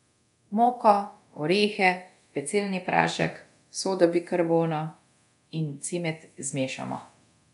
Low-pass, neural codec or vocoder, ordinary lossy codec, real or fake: 10.8 kHz; codec, 24 kHz, 0.9 kbps, DualCodec; none; fake